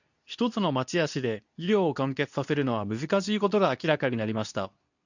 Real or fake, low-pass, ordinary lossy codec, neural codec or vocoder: fake; 7.2 kHz; none; codec, 24 kHz, 0.9 kbps, WavTokenizer, medium speech release version 2